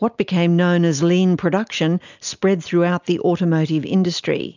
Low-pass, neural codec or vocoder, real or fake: 7.2 kHz; none; real